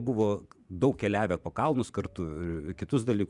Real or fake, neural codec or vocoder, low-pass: fake; vocoder, 24 kHz, 100 mel bands, Vocos; 10.8 kHz